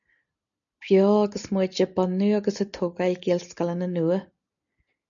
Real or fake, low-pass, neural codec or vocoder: real; 7.2 kHz; none